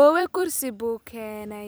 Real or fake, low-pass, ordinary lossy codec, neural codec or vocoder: fake; none; none; vocoder, 44.1 kHz, 128 mel bands every 256 samples, BigVGAN v2